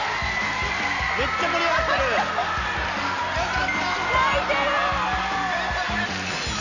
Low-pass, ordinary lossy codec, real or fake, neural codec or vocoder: 7.2 kHz; none; real; none